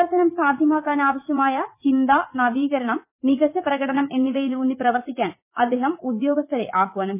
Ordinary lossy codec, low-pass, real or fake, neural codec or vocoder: MP3, 24 kbps; 3.6 kHz; fake; vocoder, 44.1 kHz, 80 mel bands, Vocos